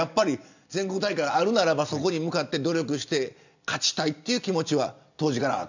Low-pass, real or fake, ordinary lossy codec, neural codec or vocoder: 7.2 kHz; real; none; none